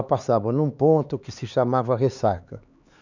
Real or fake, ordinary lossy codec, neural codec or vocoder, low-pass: fake; none; codec, 16 kHz, 4 kbps, X-Codec, HuBERT features, trained on LibriSpeech; 7.2 kHz